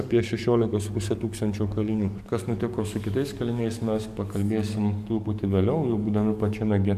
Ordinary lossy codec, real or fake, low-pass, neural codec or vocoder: MP3, 96 kbps; fake; 14.4 kHz; codec, 44.1 kHz, 7.8 kbps, DAC